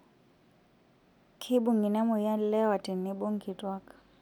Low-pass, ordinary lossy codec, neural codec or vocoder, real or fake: 19.8 kHz; none; none; real